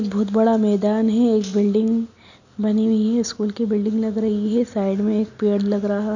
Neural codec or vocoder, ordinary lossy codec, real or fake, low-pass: none; none; real; 7.2 kHz